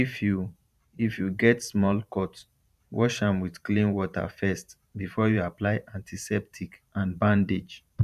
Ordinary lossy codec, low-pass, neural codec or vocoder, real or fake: none; 14.4 kHz; vocoder, 44.1 kHz, 128 mel bands every 512 samples, BigVGAN v2; fake